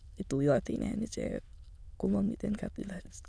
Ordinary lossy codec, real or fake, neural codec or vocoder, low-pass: none; fake; autoencoder, 22.05 kHz, a latent of 192 numbers a frame, VITS, trained on many speakers; none